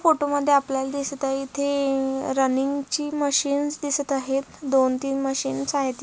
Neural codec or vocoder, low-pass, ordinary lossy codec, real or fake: none; none; none; real